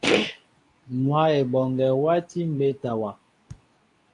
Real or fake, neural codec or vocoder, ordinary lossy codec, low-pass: fake; codec, 24 kHz, 0.9 kbps, WavTokenizer, medium speech release version 2; AAC, 48 kbps; 10.8 kHz